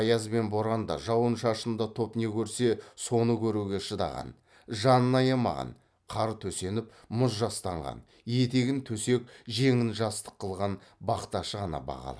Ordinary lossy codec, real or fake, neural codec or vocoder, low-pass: none; real; none; none